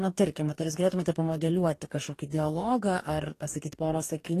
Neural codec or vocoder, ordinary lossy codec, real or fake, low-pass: codec, 44.1 kHz, 2.6 kbps, DAC; AAC, 48 kbps; fake; 14.4 kHz